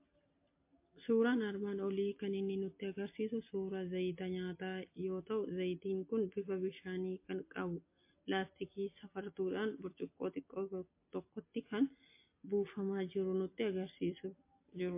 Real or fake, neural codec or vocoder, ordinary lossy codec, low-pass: real; none; MP3, 24 kbps; 3.6 kHz